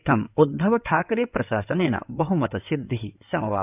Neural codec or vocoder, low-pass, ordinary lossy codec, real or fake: codec, 16 kHz in and 24 kHz out, 2.2 kbps, FireRedTTS-2 codec; 3.6 kHz; none; fake